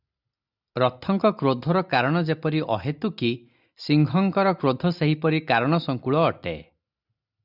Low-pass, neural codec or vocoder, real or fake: 5.4 kHz; none; real